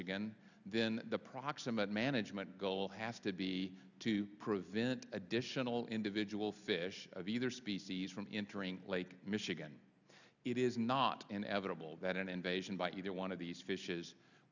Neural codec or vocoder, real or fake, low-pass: none; real; 7.2 kHz